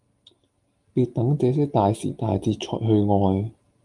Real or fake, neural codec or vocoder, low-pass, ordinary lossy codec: real; none; 10.8 kHz; Opus, 32 kbps